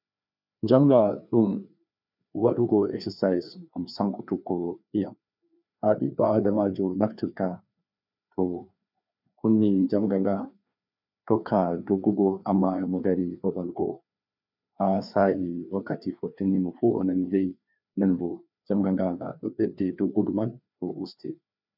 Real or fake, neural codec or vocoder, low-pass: fake; codec, 16 kHz, 2 kbps, FreqCodec, larger model; 5.4 kHz